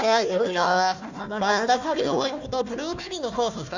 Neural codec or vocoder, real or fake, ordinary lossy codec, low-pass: codec, 16 kHz, 1 kbps, FunCodec, trained on Chinese and English, 50 frames a second; fake; none; 7.2 kHz